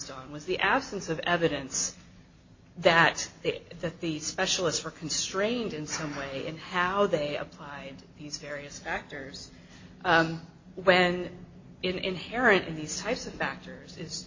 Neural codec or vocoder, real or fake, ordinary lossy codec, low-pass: none; real; MP3, 32 kbps; 7.2 kHz